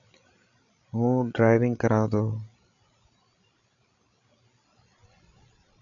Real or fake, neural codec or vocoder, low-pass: fake; codec, 16 kHz, 16 kbps, FreqCodec, larger model; 7.2 kHz